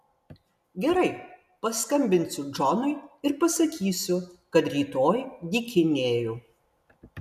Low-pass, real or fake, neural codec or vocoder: 14.4 kHz; real; none